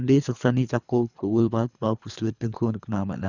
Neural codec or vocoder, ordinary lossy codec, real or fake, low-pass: codec, 24 kHz, 3 kbps, HILCodec; none; fake; 7.2 kHz